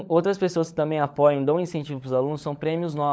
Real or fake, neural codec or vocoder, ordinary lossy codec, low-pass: fake; codec, 16 kHz, 16 kbps, FunCodec, trained on LibriTTS, 50 frames a second; none; none